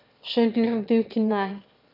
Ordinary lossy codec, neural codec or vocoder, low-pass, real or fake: none; autoencoder, 22.05 kHz, a latent of 192 numbers a frame, VITS, trained on one speaker; 5.4 kHz; fake